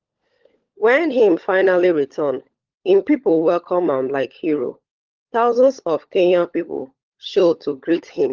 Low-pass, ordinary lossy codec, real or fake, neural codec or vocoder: 7.2 kHz; Opus, 16 kbps; fake; codec, 16 kHz, 16 kbps, FunCodec, trained on LibriTTS, 50 frames a second